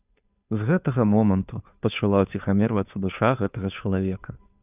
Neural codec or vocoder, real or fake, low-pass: codec, 16 kHz, 2 kbps, FunCodec, trained on Chinese and English, 25 frames a second; fake; 3.6 kHz